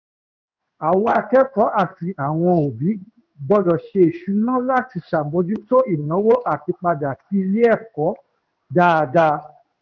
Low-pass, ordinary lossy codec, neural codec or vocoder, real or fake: 7.2 kHz; none; codec, 16 kHz in and 24 kHz out, 1 kbps, XY-Tokenizer; fake